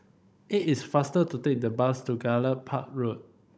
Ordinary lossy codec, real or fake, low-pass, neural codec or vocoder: none; fake; none; codec, 16 kHz, 16 kbps, FunCodec, trained on Chinese and English, 50 frames a second